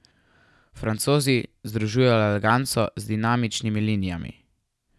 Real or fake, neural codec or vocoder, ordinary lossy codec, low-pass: real; none; none; none